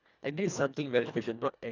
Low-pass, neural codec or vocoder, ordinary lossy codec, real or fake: 7.2 kHz; codec, 24 kHz, 1.5 kbps, HILCodec; none; fake